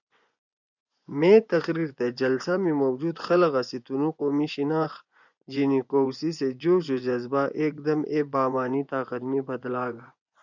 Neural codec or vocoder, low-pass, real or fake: vocoder, 24 kHz, 100 mel bands, Vocos; 7.2 kHz; fake